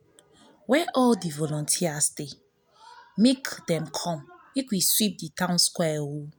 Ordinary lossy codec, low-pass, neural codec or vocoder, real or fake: none; none; none; real